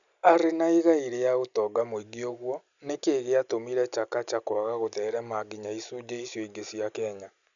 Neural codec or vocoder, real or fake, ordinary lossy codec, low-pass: none; real; none; 7.2 kHz